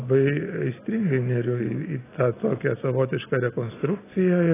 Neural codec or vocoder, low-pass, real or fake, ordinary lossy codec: none; 3.6 kHz; real; AAC, 16 kbps